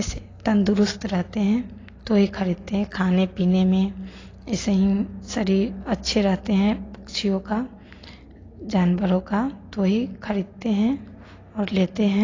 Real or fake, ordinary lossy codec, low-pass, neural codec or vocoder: real; AAC, 32 kbps; 7.2 kHz; none